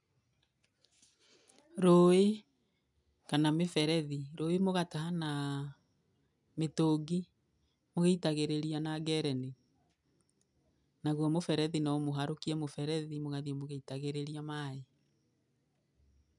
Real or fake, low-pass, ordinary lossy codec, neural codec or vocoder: real; 10.8 kHz; none; none